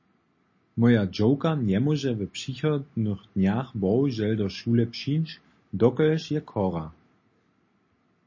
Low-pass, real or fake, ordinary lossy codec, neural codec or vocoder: 7.2 kHz; real; MP3, 32 kbps; none